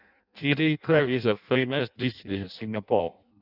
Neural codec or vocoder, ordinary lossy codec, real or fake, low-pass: codec, 16 kHz in and 24 kHz out, 0.6 kbps, FireRedTTS-2 codec; none; fake; 5.4 kHz